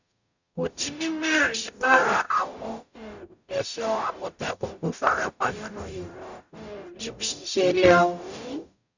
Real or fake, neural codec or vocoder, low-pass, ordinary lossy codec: fake; codec, 44.1 kHz, 0.9 kbps, DAC; 7.2 kHz; none